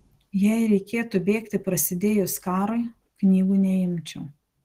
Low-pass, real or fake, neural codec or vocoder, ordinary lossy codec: 14.4 kHz; real; none; Opus, 16 kbps